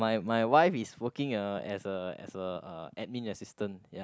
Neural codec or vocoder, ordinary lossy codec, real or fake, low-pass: none; none; real; none